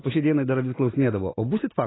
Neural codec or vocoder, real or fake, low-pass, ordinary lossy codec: none; real; 7.2 kHz; AAC, 16 kbps